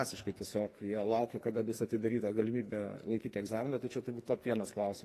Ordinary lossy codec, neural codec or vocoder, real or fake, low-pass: AAC, 48 kbps; codec, 44.1 kHz, 2.6 kbps, SNAC; fake; 14.4 kHz